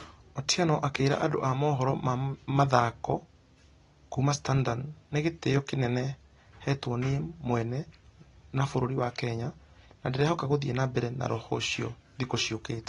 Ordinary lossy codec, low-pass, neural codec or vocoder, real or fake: AAC, 32 kbps; 10.8 kHz; none; real